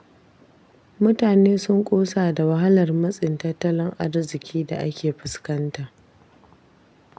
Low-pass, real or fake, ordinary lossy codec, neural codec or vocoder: none; real; none; none